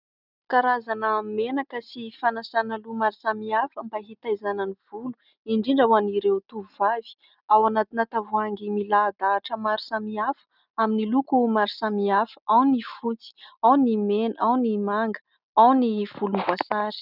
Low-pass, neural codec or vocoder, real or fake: 5.4 kHz; none; real